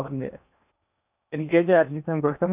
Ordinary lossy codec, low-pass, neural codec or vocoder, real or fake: none; 3.6 kHz; codec, 16 kHz in and 24 kHz out, 0.8 kbps, FocalCodec, streaming, 65536 codes; fake